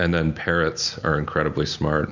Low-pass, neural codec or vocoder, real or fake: 7.2 kHz; none; real